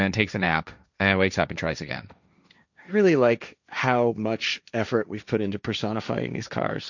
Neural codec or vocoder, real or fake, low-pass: codec, 16 kHz, 1.1 kbps, Voila-Tokenizer; fake; 7.2 kHz